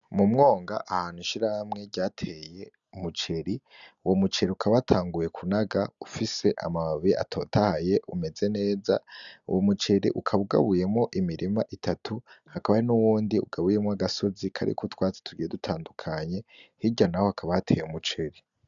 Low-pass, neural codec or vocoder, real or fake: 7.2 kHz; none; real